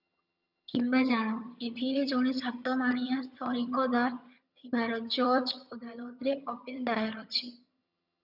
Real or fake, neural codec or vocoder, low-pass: fake; vocoder, 22.05 kHz, 80 mel bands, HiFi-GAN; 5.4 kHz